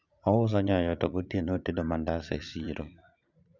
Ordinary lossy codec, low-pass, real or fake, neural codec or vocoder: none; 7.2 kHz; fake; vocoder, 44.1 kHz, 80 mel bands, Vocos